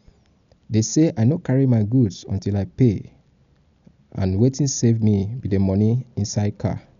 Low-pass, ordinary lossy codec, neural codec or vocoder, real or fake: 7.2 kHz; none; none; real